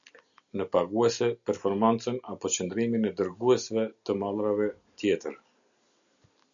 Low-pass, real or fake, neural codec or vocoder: 7.2 kHz; real; none